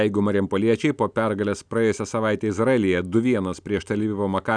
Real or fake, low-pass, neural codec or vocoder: real; 9.9 kHz; none